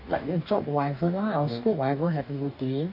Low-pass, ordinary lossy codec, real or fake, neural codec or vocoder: 5.4 kHz; none; fake; codec, 44.1 kHz, 2.6 kbps, DAC